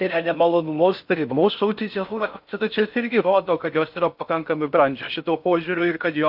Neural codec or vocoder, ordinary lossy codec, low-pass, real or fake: codec, 16 kHz in and 24 kHz out, 0.6 kbps, FocalCodec, streaming, 4096 codes; AAC, 48 kbps; 5.4 kHz; fake